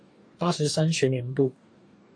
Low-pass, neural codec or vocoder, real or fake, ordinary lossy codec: 9.9 kHz; codec, 44.1 kHz, 2.6 kbps, DAC; fake; AAC, 48 kbps